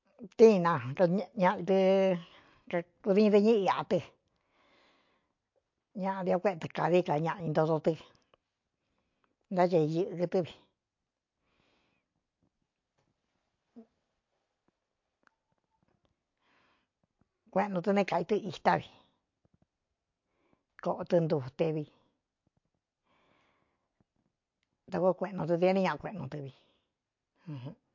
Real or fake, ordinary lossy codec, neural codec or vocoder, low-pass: real; MP3, 48 kbps; none; 7.2 kHz